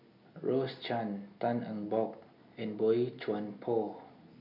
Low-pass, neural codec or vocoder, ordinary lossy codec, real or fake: 5.4 kHz; none; none; real